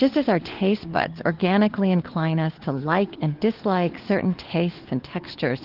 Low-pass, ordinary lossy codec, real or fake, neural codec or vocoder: 5.4 kHz; Opus, 16 kbps; fake; codec, 16 kHz, 4 kbps, FunCodec, trained on LibriTTS, 50 frames a second